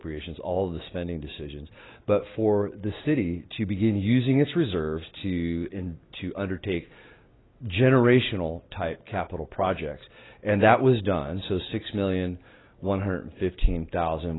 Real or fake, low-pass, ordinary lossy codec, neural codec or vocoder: real; 7.2 kHz; AAC, 16 kbps; none